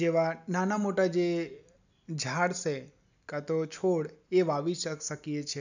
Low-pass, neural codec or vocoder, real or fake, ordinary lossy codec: 7.2 kHz; none; real; none